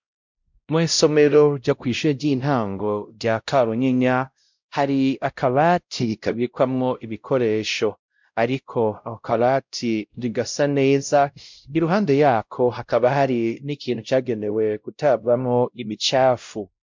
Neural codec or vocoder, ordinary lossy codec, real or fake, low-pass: codec, 16 kHz, 0.5 kbps, X-Codec, WavLM features, trained on Multilingual LibriSpeech; MP3, 64 kbps; fake; 7.2 kHz